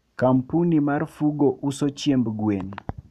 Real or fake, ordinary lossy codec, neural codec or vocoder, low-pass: real; MP3, 96 kbps; none; 14.4 kHz